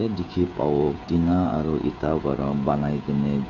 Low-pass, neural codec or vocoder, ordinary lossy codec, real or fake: 7.2 kHz; codec, 16 kHz, 16 kbps, FreqCodec, smaller model; none; fake